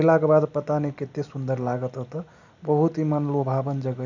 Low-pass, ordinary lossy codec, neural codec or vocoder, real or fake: 7.2 kHz; none; none; real